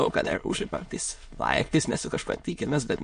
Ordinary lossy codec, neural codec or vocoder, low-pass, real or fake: MP3, 48 kbps; autoencoder, 22.05 kHz, a latent of 192 numbers a frame, VITS, trained on many speakers; 9.9 kHz; fake